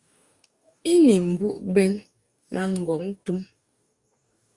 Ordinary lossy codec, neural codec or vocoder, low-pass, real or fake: Opus, 64 kbps; codec, 44.1 kHz, 2.6 kbps, DAC; 10.8 kHz; fake